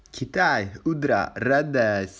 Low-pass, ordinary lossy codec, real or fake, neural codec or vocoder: none; none; real; none